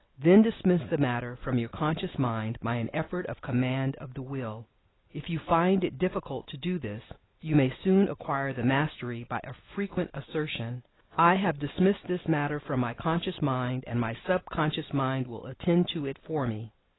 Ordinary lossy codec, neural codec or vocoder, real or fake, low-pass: AAC, 16 kbps; none; real; 7.2 kHz